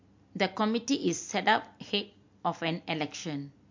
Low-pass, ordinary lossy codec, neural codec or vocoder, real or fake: 7.2 kHz; MP3, 48 kbps; none; real